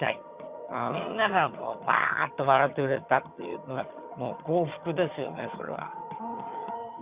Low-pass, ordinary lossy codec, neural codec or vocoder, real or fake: 3.6 kHz; Opus, 64 kbps; vocoder, 22.05 kHz, 80 mel bands, HiFi-GAN; fake